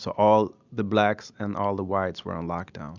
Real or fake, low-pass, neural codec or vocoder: real; 7.2 kHz; none